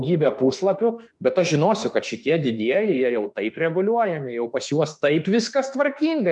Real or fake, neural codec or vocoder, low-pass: fake; autoencoder, 48 kHz, 32 numbers a frame, DAC-VAE, trained on Japanese speech; 10.8 kHz